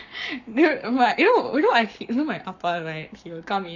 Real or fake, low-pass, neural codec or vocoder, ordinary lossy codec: fake; 7.2 kHz; codec, 44.1 kHz, 7.8 kbps, Pupu-Codec; none